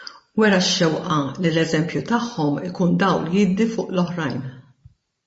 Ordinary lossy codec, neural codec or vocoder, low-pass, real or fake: MP3, 32 kbps; vocoder, 44.1 kHz, 128 mel bands every 512 samples, BigVGAN v2; 10.8 kHz; fake